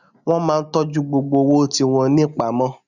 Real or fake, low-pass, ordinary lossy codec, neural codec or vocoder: real; 7.2 kHz; none; none